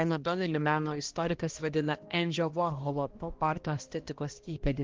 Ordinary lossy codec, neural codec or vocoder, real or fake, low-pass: Opus, 16 kbps; codec, 16 kHz, 1 kbps, X-Codec, HuBERT features, trained on balanced general audio; fake; 7.2 kHz